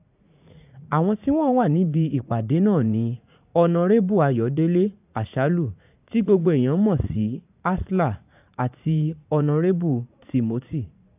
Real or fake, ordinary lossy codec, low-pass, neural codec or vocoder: fake; AAC, 32 kbps; 3.6 kHz; vocoder, 44.1 kHz, 80 mel bands, Vocos